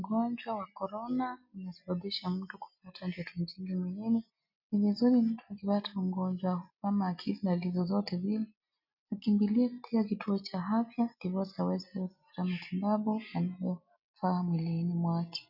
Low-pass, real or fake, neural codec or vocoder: 5.4 kHz; real; none